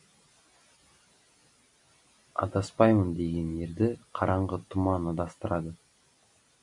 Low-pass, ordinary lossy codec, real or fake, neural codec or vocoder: 10.8 kHz; AAC, 64 kbps; real; none